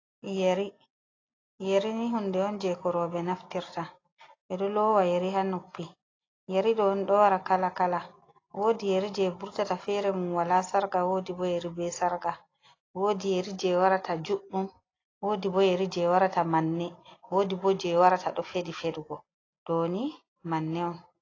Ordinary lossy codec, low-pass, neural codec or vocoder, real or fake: AAC, 32 kbps; 7.2 kHz; none; real